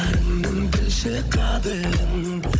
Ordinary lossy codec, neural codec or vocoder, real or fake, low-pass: none; codec, 16 kHz, 16 kbps, FunCodec, trained on Chinese and English, 50 frames a second; fake; none